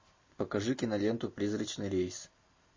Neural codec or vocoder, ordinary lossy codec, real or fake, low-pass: none; MP3, 32 kbps; real; 7.2 kHz